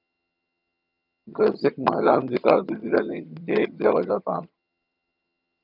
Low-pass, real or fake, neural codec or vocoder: 5.4 kHz; fake; vocoder, 22.05 kHz, 80 mel bands, HiFi-GAN